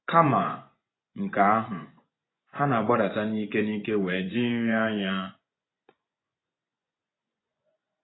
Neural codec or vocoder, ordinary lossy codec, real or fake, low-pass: vocoder, 24 kHz, 100 mel bands, Vocos; AAC, 16 kbps; fake; 7.2 kHz